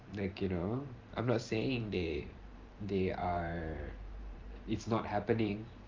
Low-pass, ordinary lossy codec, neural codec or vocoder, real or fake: 7.2 kHz; Opus, 32 kbps; vocoder, 44.1 kHz, 128 mel bands every 512 samples, BigVGAN v2; fake